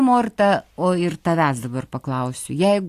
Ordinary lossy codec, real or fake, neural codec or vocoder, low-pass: AAC, 64 kbps; real; none; 14.4 kHz